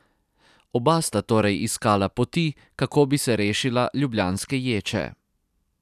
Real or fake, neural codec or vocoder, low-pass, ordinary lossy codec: real; none; 14.4 kHz; none